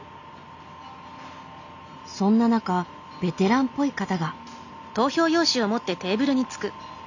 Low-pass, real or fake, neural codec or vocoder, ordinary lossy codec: 7.2 kHz; real; none; none